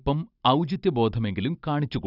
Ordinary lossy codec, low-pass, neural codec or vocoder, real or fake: none; 5.4 kHz; none; real